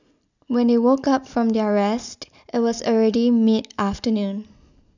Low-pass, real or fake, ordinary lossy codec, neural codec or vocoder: 7.2 kHz; real; none; none